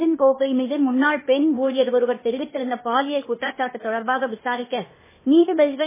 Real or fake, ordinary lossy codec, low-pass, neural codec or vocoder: fake; MP3, 16 kbps; 3.6 kHz; codec, 16 kHz, 0.8 kbps, ZipCodec